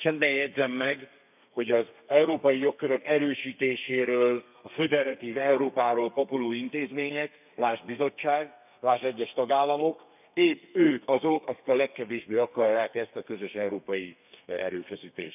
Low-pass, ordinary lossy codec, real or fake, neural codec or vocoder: 3.6 kHz; none; fake; codec, 32 kHz, 1.9 kbps, SNAC